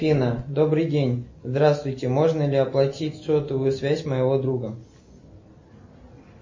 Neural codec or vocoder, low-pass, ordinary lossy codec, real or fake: none; 7.2 kHz; MP3, 32 kbps; real